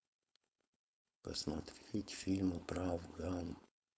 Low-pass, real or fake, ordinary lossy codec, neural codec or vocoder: none; fake; none; codec, 16 kHz, 4.8 kbps, FACodec